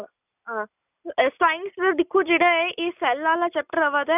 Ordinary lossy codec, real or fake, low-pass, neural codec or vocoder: none; real; 3.6 kHz; none